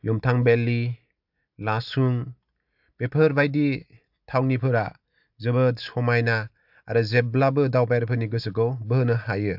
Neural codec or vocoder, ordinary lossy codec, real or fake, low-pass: none; AAC, 48 kbps; real; 5.4 kHz